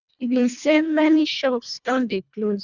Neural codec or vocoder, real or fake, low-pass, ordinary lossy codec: codec, 24 kHz, 1.5 kbps, HILCodec; fake; 7.2 kHz; none